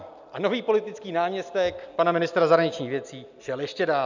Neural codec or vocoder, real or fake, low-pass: none; real; 7.2 kHz